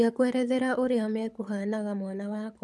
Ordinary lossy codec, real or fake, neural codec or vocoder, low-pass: none; fake; codec, 24 kHz, 6 kbps, HILCodec; none